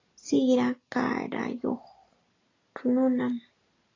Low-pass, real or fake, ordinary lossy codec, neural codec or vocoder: 7.2 kHz; real; AAC, 32 kbps; none